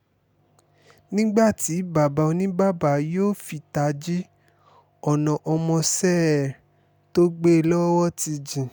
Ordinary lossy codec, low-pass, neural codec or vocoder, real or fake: none; none; none; real